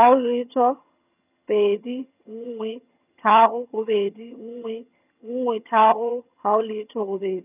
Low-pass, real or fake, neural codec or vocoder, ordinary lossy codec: 3.6 kHz; fake; vocoder, 22.05 kHz, 80 mel bands, HiFi-GAN; none